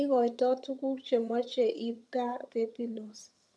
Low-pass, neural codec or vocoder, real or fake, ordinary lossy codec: none; vocoder, 22.05 kHz, 80 mel bands, HiFi-GAN; fake; none